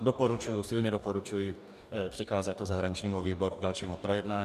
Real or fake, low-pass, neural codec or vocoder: fake; 14.4 kHz; codec, 44.1 kHz, 2.6 kbps, DAC